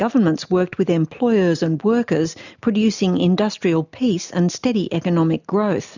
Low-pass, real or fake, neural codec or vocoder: 7.2 kHz; real; none